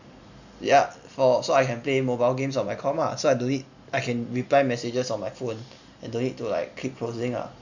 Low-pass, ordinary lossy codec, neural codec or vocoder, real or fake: 7.2 kHz; none; none; real